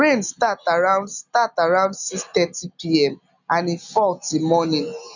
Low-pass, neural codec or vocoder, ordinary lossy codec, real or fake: 7.2 kHz; none; none; real